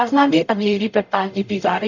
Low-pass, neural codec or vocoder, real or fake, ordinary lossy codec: 7.2 kHz; codec, 44.1 kHz, 0.9 kbps, DAC; fake; none